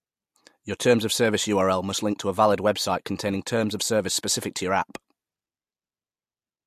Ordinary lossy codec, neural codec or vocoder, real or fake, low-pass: MP3, 64 kbps; none; real; 14.4 kHz